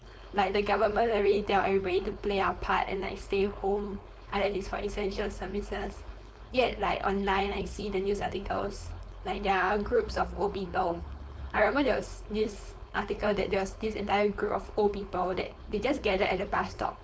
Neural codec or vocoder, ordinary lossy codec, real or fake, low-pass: codec, 16 kHz, 4.8 kbps, FACodec; none; fake; none